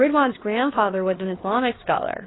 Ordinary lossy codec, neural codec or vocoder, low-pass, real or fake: AAC, 16 kbps; codec, 16 kHz, 4 kbps, FreqCodec, larger model; 7.2 kHz; fake